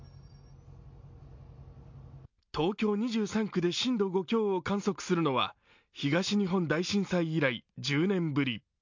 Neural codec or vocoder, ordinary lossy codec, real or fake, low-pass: none; MP3, 64 kbps; real; 7.2 kHz